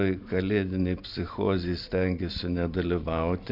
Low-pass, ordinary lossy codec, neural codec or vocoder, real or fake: 5.4 kHz; MP3, 48 kbps; vocoder, 44.1 kHz, 128 mel bands every 512 samples, BigVGAN v2; fake